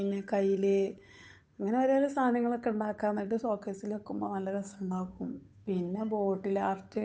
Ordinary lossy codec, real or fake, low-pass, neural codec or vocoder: none; fake; none; codec, 16 kHz, 8 kbps, FunCodec, trained on Chinese and English, 25 frames a second